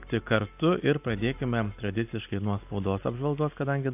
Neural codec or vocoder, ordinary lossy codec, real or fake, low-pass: none; AAC, 32 kbps; real; 3.6 kHz